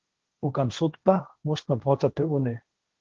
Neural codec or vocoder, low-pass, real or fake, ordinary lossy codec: codec, 16 kHz, 1.1 kbps, Voila-Tokenizer; 7.2 kHz; fake; Opus, 16 kbps